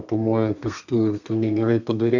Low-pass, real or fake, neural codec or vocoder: 7.2 kHz; fake; codec, 32 kHz, 1.9 kbps, SNAC